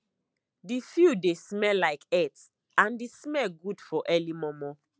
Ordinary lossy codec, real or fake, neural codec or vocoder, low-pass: none; real; none; none